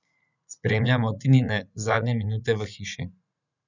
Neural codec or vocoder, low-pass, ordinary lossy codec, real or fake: vocoder, 44.1 kHz, 80 mel bands, Vocos; 7.2 kHz; none; fake